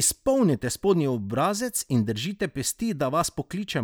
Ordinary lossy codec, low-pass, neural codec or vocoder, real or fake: none; none; none; real